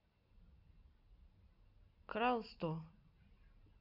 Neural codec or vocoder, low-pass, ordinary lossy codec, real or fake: none; 5.4 kHz; none; real